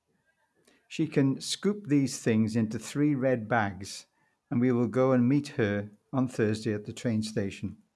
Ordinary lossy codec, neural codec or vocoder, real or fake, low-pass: none; none; real; none